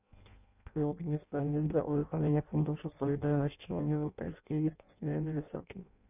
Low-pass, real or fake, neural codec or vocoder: 3.6 kHz; fake; codec, 16 kHz in and 24 kHz out, 0.6 kbps, FireRedTTS-2 codec